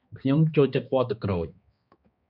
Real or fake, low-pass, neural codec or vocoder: fake; 5.4 kHz; codec, 16 kHz, 2 kbps, X-Codec, HuBERT features, trained on balanced general audio